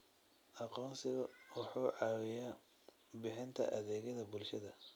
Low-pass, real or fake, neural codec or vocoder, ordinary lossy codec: none; real; none; none